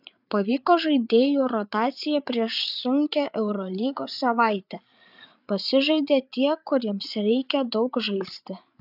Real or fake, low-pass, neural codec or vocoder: fake; 5.4 kHz; codec, 16 kHz, 8 kbps, FreqCodec, larger model